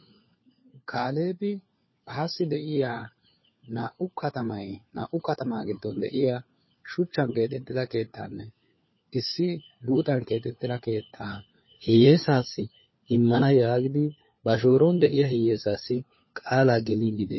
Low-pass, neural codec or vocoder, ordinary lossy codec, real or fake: 7.2 kHz; codec, 16 kHz, 4 kbps, FunCodec, trained on LibriTTS, 50 frames a second; MP3, 24 kbps; fake